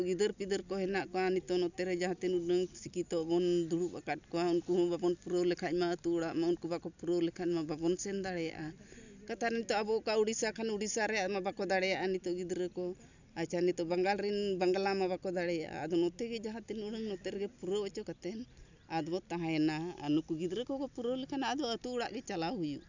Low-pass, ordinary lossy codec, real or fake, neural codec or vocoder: 7.2 kHz; none; real; none